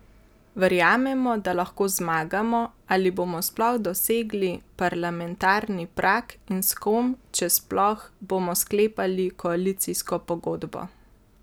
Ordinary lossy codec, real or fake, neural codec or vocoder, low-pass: none; real; none; none